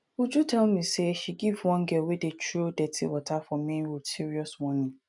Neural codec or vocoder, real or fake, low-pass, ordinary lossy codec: none; real; 10.8 kHz; none